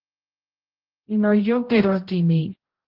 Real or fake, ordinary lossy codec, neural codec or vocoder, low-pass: fake; Opus, 16 kbps; codec, 16 kHz, 0.5 kbps, X-Codec, HuBERT features, trained on general audio; 5.4 kHz